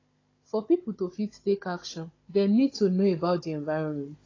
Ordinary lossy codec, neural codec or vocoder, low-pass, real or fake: AAC, 32 kbps; codec, 16 kHz, 16 kbps, FunCodec, trained on Chinese and English, 50 frames a second; 7.2 kHz; fake